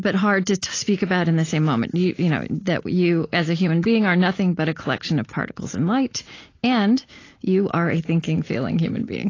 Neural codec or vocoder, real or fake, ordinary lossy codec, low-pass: none; real; AAC, 32 kbps; 7.2 kHz